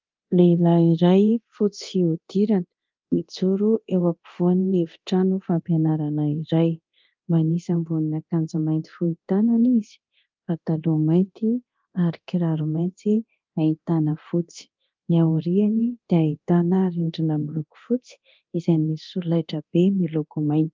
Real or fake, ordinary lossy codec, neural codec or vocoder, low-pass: fake; Opus, 32 kbps; codec, 24 kHz, 0.9 kbps, DualCodec; 7.2 kHz